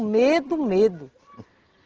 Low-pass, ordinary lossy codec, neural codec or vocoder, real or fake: 7.2 kHz; Opus, 16 kbps; none; real